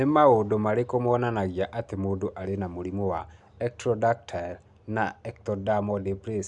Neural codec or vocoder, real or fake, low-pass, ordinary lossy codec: none; real; 10.8 kHz; none